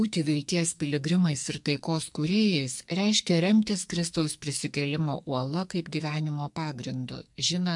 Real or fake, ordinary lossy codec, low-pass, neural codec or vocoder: fake; MP3, 64 kbps; 10.8 kHz; codec, 44.1 kHz, 2.6 kbps, SNAC